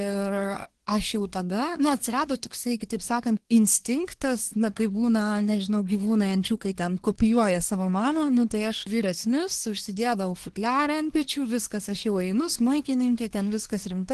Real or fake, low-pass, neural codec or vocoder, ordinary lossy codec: fake; 10.8 kHz; codec, 24 kHz, 1 kbps, SNAC; Opus, 16 kbps